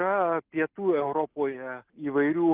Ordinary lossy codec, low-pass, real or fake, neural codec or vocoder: Opus, 16 kbps; 3.6 kHz; real; none